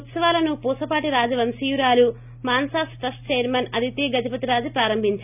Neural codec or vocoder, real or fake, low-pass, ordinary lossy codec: none; real; 3.6 kHz; none